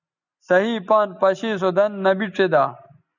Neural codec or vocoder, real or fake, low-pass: none; real; 7.2 kHz